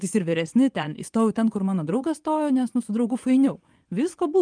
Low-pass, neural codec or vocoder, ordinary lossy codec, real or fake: 9.9 kHz; vocoder, 24 kHz, 100 mel bands, Vocos; Opus, 32 kbps; fake